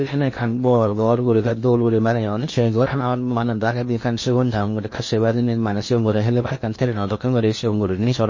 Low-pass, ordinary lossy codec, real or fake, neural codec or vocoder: 7.2 kHz; MP3, 32 kbps; fake; codec, 16 kHz in and 24 kHz out, 0.8 kbps, FocalCodec, streaming, 65536 codes